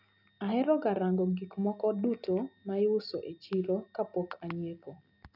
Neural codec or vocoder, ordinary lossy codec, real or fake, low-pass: none; none; real; 5.4 kHz